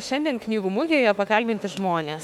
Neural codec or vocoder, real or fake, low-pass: autoencoder, 48 kHz, 32 numbers a frame, DAC-VAE, trained on Japanese speech; fake; 19.8 kHz